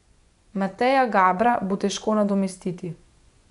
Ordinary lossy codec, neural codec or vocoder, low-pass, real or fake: none; vocoder, 24 kHz, 100 mel bands, Vocos; 10.8 kHz; fake